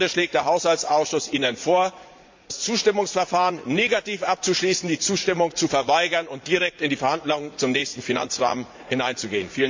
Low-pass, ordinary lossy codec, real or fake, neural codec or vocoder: 7.2 kHz; MP3, 48 kbps; fake; vocoder, 44.1 kHz, 80 mel bands, Vocos